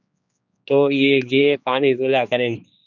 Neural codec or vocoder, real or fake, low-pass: codec, 16 kHz, 2 kbps, X-Codec, HuBERT features, trained on general audio; fake; 7.2 kHz